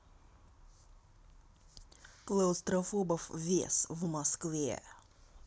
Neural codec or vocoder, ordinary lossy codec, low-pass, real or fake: none; none; none; real